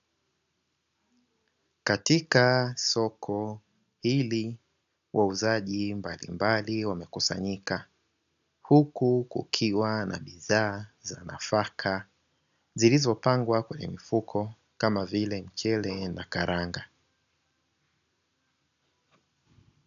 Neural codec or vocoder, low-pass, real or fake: none; 7.2 kHz; real